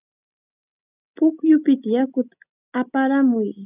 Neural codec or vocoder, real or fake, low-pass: none; real; 3.6 kHz